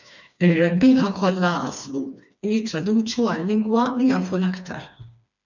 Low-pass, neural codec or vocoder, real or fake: 7.2 kHz; codec, 16 kHz, 2 kbps, FreqCodec, smaller model; fake